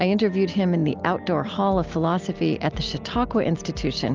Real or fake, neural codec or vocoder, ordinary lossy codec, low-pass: real; none; Opus, 24 kbps; 7.2 kHz